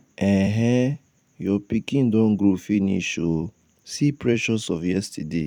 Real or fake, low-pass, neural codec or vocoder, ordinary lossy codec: fake; 19.8 kHz; vocoder, 44.1 kHz, 128 mel bands every 256 samples, BigVGAN v2; none